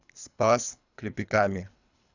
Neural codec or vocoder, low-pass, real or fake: codec, 24 kHz, 3 kbps, HILCodec; 7.2 kHz; fake